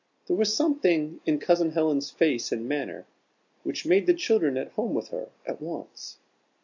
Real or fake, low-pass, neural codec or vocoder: real; 7.2 kHz; none